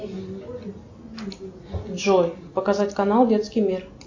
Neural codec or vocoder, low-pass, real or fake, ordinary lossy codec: none; 7.2 kHz; real; AAC, 48 kbps